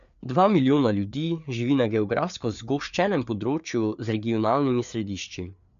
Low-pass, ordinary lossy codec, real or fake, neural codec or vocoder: 7.2 kHz; none; fake; codec, 16 kHz, 4 kbps, FreqCodec, larger model